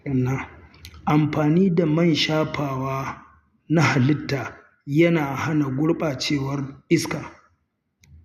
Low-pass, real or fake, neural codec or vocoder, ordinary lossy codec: 14.4 kHz; real; none; none